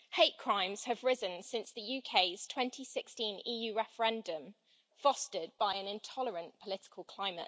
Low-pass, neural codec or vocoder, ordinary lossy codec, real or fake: none; none; none; real